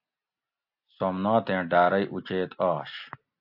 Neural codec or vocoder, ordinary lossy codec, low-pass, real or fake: none; AAC, 48 kbps; 5.4 kHz; real